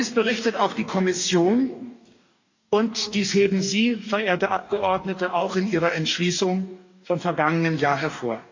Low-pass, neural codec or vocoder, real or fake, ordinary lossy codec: 7.2 kHz; codec, 44.1 kHz, 2.6 kbps, DAC; fake; AAC, 48 kbps